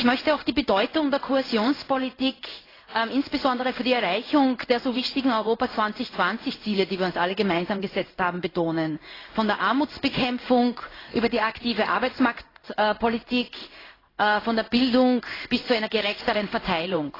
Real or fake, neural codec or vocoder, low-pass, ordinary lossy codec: fake; codec, 16 kHz in and 24 kHz out, 1 kbps, XY-Tokenizer; 5.4 kHz; AAC, 24 kbps